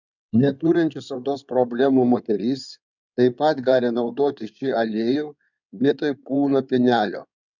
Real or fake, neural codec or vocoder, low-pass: fake; codec, 16 kHz in and 24 kHz out, 2.2 kbps, FireRedTTS-2 codec; 7.2 kHz